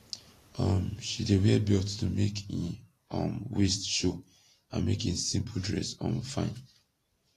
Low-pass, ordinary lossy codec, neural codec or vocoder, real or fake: 14.4 kHz; AAC, 48 kbps; vocoder, 44.1 kHz, 128 mel bands every 512 samples, BigVGAN v2; fake